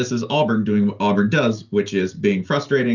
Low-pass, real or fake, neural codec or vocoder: 7.2 kHz; real; none